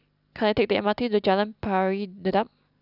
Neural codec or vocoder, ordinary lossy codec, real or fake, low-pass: none; none; real; 5.4 kHz